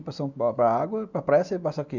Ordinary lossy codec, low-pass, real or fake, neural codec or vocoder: none; 7.2 kHz; real; none